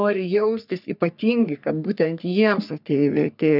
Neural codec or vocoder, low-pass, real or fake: codec, 44.1 kHz, 3.4 kbps, Pupu-Codec; 5.4 kHz; fake